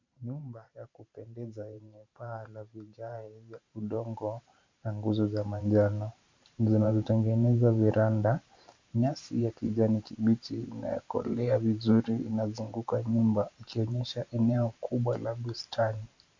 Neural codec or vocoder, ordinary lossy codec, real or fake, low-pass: vocoder, 44.1 kHz, 128 mel bands every 512 samples, BigVGAN v2; MP3, 64 kbps; fake; 7.2 kHz